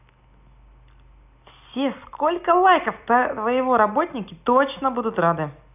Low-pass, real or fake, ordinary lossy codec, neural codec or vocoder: 3.6 kHz; real; none; none